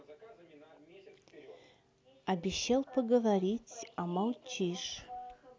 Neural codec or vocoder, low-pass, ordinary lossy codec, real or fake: none; none; none; real